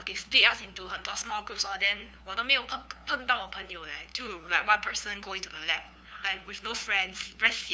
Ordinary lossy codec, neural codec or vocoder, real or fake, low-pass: none; codec, 16 kHz, 2 kbps, FunCodec, trained on LibriTTS, 25 frames a second; fake; none